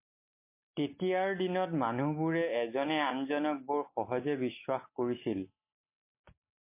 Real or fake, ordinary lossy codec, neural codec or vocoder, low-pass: real; MP3, 24 kbps; none; 3.6 kHz